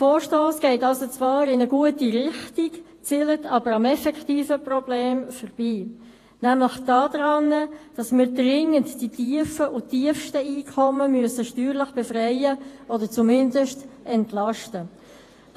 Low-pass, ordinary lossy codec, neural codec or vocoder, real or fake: 14.4 kHz; AAC, 48 kbps; vocoder, 48 kHz, 128 mel bands, Vocos; fake